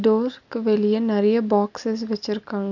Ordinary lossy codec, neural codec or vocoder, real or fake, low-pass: none; none; real; 7.2 kHz